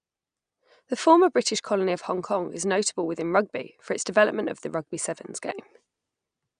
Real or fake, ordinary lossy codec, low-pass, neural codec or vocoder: real; none; 9.9 kHz; none